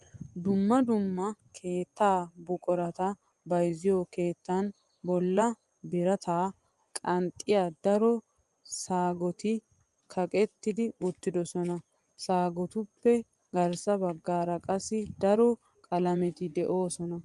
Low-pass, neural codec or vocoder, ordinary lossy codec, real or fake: 10.8 kHz; vocoder, 24 kHz, 100 mel bands, Vocos; Opus, 32 kbps; fake